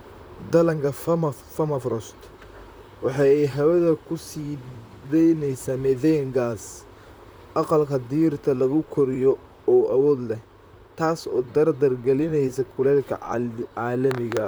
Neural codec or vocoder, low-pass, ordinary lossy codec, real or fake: vocoder, 44.1 kHz, 128 mel bands, Pupu-Vocoder; none; none; fake